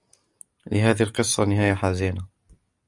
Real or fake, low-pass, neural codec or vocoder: real; 10.8 kHz; none